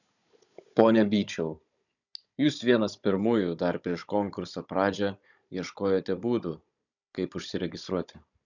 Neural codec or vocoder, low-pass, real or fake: codec, 16 kHz, 16 kbps, FunCodec, trained on Chinese and English, 50 frames a second; 7.2 kHz; fake